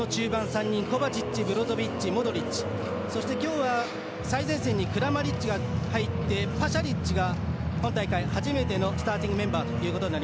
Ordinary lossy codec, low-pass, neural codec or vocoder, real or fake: none; none; none; real